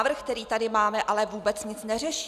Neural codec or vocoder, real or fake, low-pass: none; real; 14.4 kHz